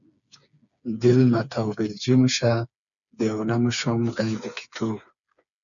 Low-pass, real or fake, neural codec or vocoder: 7.2 kHz; fake; codec, 16 kHz, 4 kbps, FreqCodec, smaller model